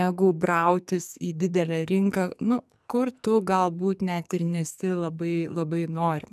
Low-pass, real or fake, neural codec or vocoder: 14.4 kHz; fake; codec, 44.1 kHz, 2.6 kbps, SNAC